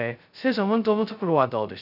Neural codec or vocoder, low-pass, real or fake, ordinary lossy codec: codec, 16 kHz, 0.2 kbps, FocalCodec; 5.4 kHz; fake; none